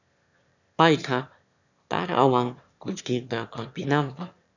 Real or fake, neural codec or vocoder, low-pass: fake; autoencoder, 22.05 kHz, a latent of 192 numbers a frame, VITS, trained on one speaker; 7.2 kHz